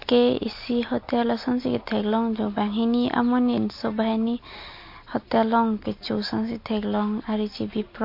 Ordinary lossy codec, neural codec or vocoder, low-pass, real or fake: MP3, 32 kbps; none; 5.4 kHz; real